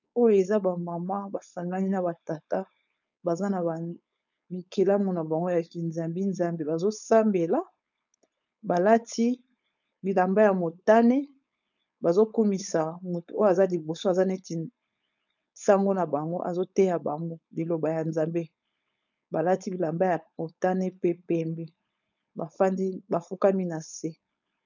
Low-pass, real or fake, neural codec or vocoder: 7.2 kHz; fake; codec, 16 kHz, 4.8 kbps, FACodec